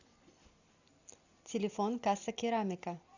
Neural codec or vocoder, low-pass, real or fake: none; 7.2 kHz; real